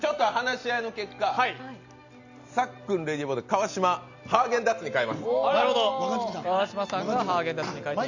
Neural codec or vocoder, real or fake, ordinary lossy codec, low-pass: none; real; Opus, 64 kbps; 7.2 kHz